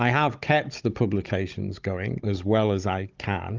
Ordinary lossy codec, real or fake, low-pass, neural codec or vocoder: Opus, 24 kbps; fake; 7.2 kHz; codec, 16 kHz, 16 kbps, FunCodec, trained on Chinese and English, 50 frames a second